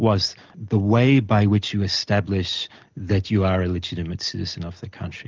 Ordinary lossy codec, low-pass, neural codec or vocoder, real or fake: Opus, 24 kbps; 7.2 kHz; none; real